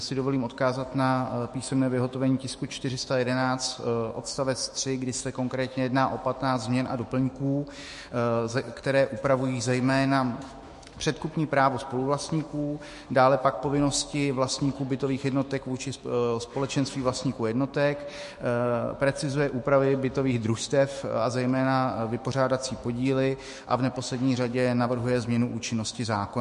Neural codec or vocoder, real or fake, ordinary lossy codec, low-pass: autoencoder, 48 kHz, 128 numbers a frame, DAC-VAE, trained on Japanese speech; fake; MP3, 48 kbps; 14.4 kHz